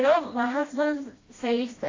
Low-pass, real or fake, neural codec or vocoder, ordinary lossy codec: 7.2 kHz; fake; codec, 16 kHz, 1 kbps, FreqCodec, smaller model; AAC, 32 kbps